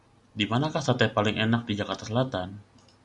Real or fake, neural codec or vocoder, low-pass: real; none; 10.8 kHz